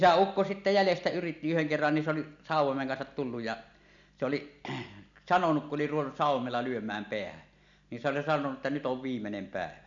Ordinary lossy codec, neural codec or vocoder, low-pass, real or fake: none; none; 7.2 kHz; real